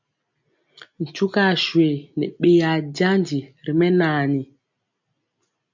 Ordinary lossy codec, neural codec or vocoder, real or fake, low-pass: MP3, 64 kbps; none; real; 7.2 kHz